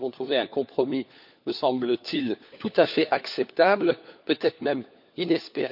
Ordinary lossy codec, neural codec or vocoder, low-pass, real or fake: none; codec, 16 kHz, 4 kbps, FunCodec, trained on LibriTTS, 50 frames a second; 5.4 kHz; fake